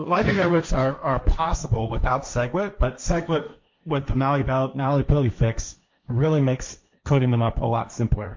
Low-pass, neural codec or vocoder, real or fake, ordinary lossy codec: 7.2 kHz; codec, 16 kHz, 1.1 kbps, Voila-Tokenizer; fake; MP3, 48 kbps